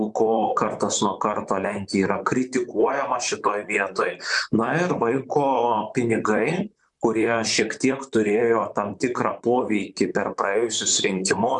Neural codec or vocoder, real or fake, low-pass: vocoder, 44.1 kHz, 128 mel bands, Pupu-Vocoder; fake; 10.8 kHz